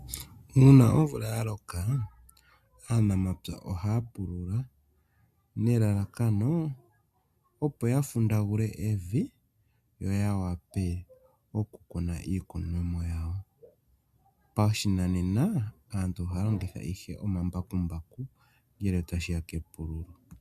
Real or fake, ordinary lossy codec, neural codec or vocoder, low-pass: real; AAC, 96 kbps; none; 14.4 kHz